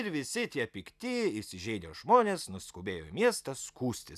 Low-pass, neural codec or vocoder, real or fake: 14.4 kHz; none; real